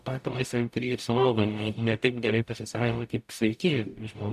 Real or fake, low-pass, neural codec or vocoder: fake; 14.4 kHz; codec, 44.1 kHz, 0.9 kbps, DAC